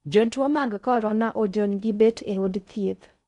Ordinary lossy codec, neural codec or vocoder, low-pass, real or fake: MP3, 64 kbps; codec, 16 kHz in and 24 kHz out, 0.6 kbps, FocalCodec, streaming, 4096 codes; 10.8 kHz; fake